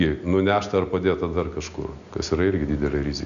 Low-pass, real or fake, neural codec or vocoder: 7.2 kHz; real; none